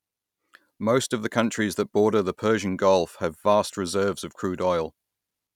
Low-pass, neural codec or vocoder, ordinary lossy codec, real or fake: 19.8 kHz; none; none; real